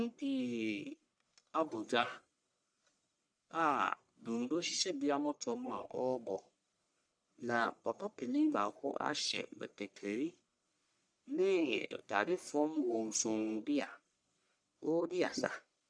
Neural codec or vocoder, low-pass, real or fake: codec, 44.1 kHz, 1.7 kbps, Pupu-Codec; 9.9 kHz; fake